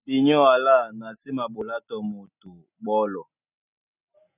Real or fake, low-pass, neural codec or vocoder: real; 3.6 kHz; none